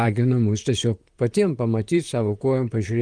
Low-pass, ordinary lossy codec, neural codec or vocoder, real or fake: 9.9 kHz; Opus, 32 kbps; vocoder, 44.1 kHz, 128 mel bands, Pupu-Vocoder; fake